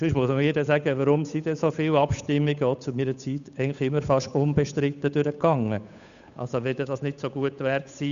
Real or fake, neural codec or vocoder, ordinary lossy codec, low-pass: fake; codec, 16 kHz, 8 kbps, FunCodec, trained on Chinese and English, 25 frames a second; none; 7.2 kHz